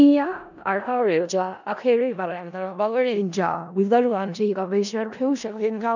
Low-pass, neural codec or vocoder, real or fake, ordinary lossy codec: 7.2 kHz; codec, 16 kHz in and 24 kHz out, 0.4 kbps, LongCat-Audio-Codec, four codebook decoder; fake; none